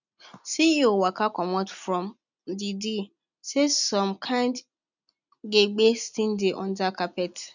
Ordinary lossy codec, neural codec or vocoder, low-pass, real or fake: none; none; 7.2 kHz; real